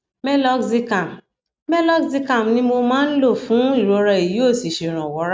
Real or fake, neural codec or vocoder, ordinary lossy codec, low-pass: real; none; none; none